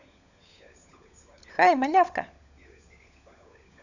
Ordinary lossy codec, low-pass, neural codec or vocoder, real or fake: none; 7.2 kHz; codec, 16 kHz, 16 kbps, FunCodec, trained on LibriTTS, 50 frames a second; fake